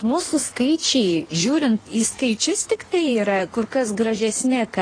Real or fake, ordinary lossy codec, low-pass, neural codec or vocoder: fake; AAC, 32 kbps; 9.9 kHz; codec, 16 kHz in and 24 kHz out, 1.1 kbps, FireRedTTS-2 codec